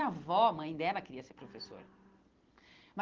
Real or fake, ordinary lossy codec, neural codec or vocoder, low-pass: real; Opus, 16 kbps; none; 7.2 kHz